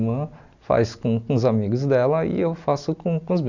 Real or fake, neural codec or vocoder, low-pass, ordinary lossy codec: real; none; 7.2 kHz; none